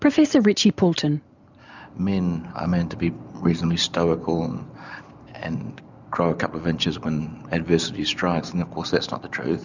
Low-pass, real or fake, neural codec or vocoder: 7.2 kHz; real; none